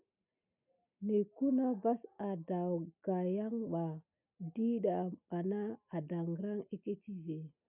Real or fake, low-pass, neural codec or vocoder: real; 3.6 kHz; none